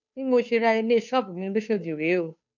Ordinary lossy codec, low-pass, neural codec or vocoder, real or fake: none; 7.2 kHz; codec, 16 kHz, 2 kbps, FunCodec, trained on Chinese and English, 25 frames a second; fake